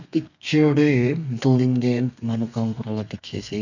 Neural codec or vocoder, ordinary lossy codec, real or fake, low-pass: codec, 32 kHz, 1.9 kbps, SNAC; none; fake; 7.2 kHz